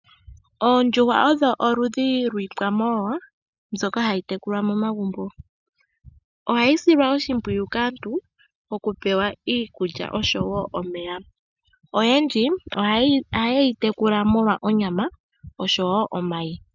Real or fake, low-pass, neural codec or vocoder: real; 7.2 kHz; none